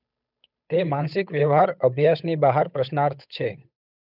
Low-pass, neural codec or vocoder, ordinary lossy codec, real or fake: 5.4 kHz; codec, 16 kHz, 8 kbps, FunCodec, trained on Chinese and English, 25 frames a second; none; fake